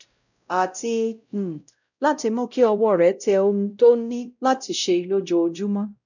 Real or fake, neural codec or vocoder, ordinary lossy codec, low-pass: fake; codec, 16 kHz, 0.5 kbps, X-Codec, WavLM features, trained on Multilingual LibriSpeech; none; 7.2 kHz